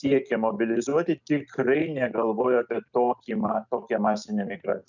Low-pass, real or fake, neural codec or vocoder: 7.2 kHz; real; none